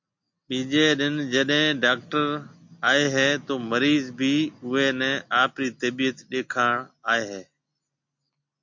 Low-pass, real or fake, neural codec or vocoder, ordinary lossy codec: 7.2 kHz; real; none; MP3, 48 kbps